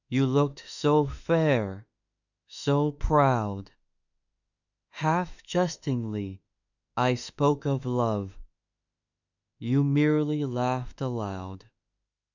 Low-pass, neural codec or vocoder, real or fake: 7.2 kHz; autoencoder, 48 kHz, 32 numbers a frame, DAC-VAE, trained on Japanese speech; fake